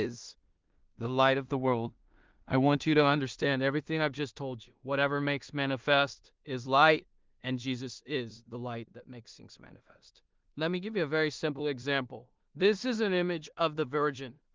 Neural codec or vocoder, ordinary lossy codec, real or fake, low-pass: codec, 16 kHz in and 24 kHz out, 0.4 kbps, LongCat-Audio-Codec, two codebook decoder; Opus, 32 kbps; fake; 7.2 kHz